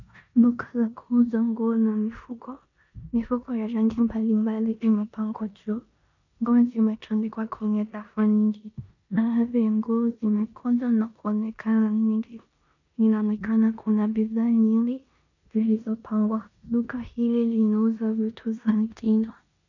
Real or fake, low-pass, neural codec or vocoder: fake; 7.2 kHz; codec, 16 kHz in and 24 kHz out, 0.9 kbps, LongCat-Audio-Codec, four codebook decoder